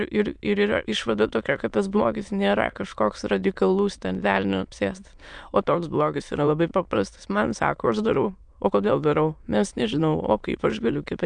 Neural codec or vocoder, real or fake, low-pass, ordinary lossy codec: autoencoder, 22.05 kHz, a latent of 192 numbers a frame, VITS, trained on many speakers; fake; 9.9 kHz; MP3, 96 kbps